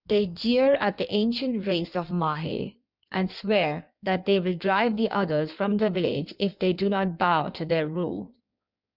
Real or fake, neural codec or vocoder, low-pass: fake; codec, 16 kHz in and 24 kHz out, 1.1 kbps, FireRedTTS-2 codec; 5.4 kHz